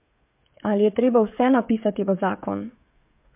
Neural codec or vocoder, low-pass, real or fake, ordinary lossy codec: codec, 16 kHz, 16 kbps, FreqCodec, smaller model; 3.6 kHz; fake; MP3, 32 kbps